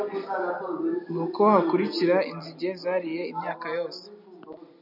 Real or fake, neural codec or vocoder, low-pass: real; none; 5.4 kHz